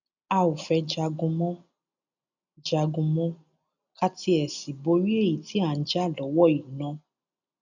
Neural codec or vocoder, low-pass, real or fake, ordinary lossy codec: none; 7.2 kHz; real; none